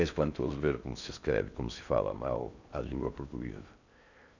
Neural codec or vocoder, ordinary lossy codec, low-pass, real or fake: codec, 16 kHz in and 24 kHz out, 0.6 kbps, FocalCodec, streaming, 4096 codes; none; 7.2 kHz; fake